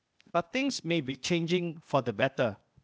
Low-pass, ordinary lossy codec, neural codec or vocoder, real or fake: none; none; codec, 16 kHz, 0.8 kbps, ZipCodec; fake